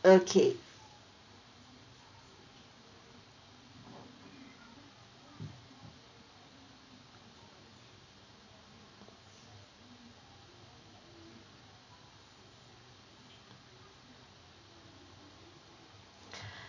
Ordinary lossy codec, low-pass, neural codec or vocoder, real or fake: none; 7.2 kHz; none; real